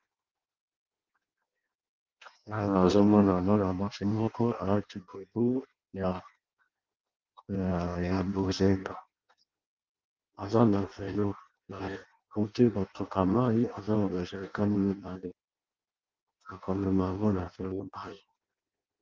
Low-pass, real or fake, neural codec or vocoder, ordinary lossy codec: 7.2 kHz; fake; codec, 16 kHz in and 24 kHz out, 0.6 kbps, FireRedTTS-2 codec; Opus, 24 kbps